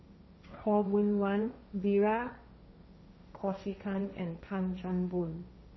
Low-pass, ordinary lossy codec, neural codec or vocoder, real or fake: 7.2 kHz; MP3, 24 kbps; codec, 16 kHz, 1.1 kbps, Voila-Tokenizer; fake